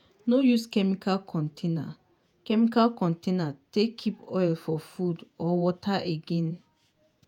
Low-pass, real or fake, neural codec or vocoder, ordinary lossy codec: 19.8 kHz; fake; vocoder, 48 kHz, 128 mel bands, Vocos; none